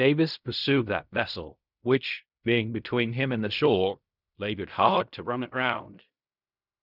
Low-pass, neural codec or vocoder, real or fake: 5.4 kHz; codec, 16 kHz in and 24 kHz out, 0.4 kbps, LongCat-Audio-Codec, fine tuned four codebook decoder; fake